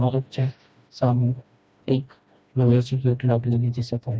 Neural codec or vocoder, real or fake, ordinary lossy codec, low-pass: codec, 16 kHz, 1 kbps, FreqCodec, smaller model; fake; none; none